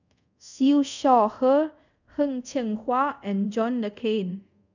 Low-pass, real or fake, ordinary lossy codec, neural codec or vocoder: 7.2 kHz; fake; none; codec, 24 kHz, 0.5 kbps, DualCodec